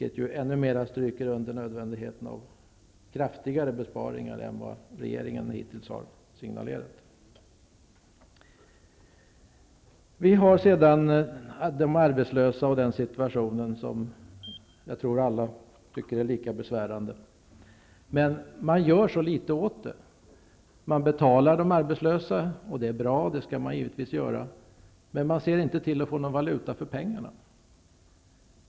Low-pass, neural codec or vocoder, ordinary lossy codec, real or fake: none; none; none; real